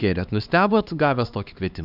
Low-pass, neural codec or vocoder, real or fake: 5.4 kHz; codec, 16 kHz, 2 kbps, X-Codec, HuBERT features, trained on LibriSpeech; fake